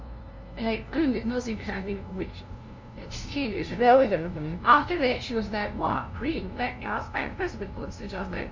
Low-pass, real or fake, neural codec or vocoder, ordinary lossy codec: 7.2 kHz; fake; codec, 16 kHz, 0.5 kbps, FunCodec, trained on LibriTTS, 25 frames a second; MP3, 64 kbps